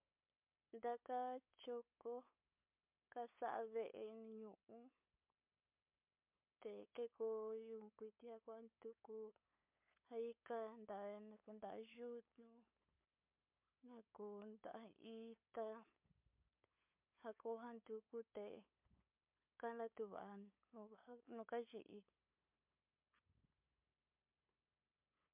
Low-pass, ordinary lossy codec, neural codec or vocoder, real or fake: 3.6 kHz; MP3, 32 kbps; codec, 16 kHz, 8 kbps, FunCodec, trained on LibriTTS, 25 frames a second; fake